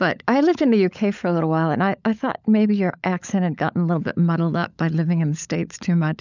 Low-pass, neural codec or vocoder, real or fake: 7.2 kHz; codec, 16 kHz, 16 kbps, FunCodec, trained on LibriTTS, 50 frames a second; fake